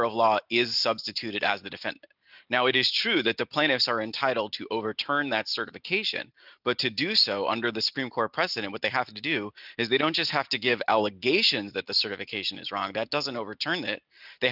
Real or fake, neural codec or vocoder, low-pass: real; none; 5.4 kHz